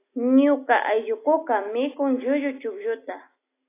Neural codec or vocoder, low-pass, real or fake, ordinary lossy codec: none; 3.6 kHz; real; AAC, 24 kbps